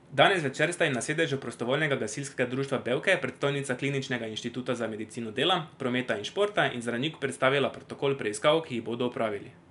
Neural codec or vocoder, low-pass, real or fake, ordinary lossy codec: none; 10.8 kHz; real; none